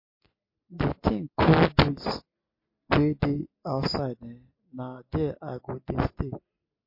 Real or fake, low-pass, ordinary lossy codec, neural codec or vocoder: real; 5.4 kHz; MP3, 32 kbps; none